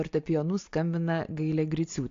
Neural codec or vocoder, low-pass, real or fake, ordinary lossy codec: none; 7.2 kHz; real; AAC, 48 kbps